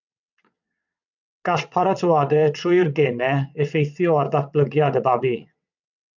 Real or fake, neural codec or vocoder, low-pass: fake; vocoder, 22.05 kHz, 80 mel bands, WaveNeXt; 7.2 kHz